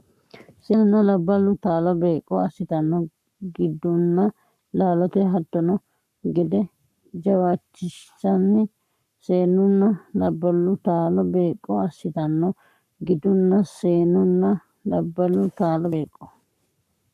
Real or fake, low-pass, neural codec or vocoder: fake; 14.4 kHz; codec, 44.1 kHz, 7.8 kbps, Pupu-Codec